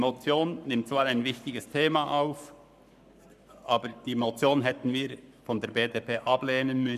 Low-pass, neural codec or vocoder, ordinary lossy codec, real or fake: 14.4 kHz; codec, 44.1 kHz, 7.8 kbps, Pupu-Codec; none; fake